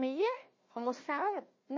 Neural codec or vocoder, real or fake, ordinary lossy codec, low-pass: codec, 16 kHz, 1 kbps, FunCodec, trained on Chinese and English, 50 frames a second; fake; MP3, 32 kbps; 7.2 kHz